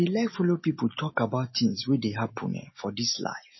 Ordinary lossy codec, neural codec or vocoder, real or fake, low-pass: MP3, 24 kbps; none; real; 7.2 kHz